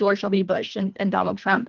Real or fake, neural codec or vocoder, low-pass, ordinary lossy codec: fake; codec, 24 kHz, 1.5 kbps, HILCodec; 7.2 kHz; Opus, 24 kbps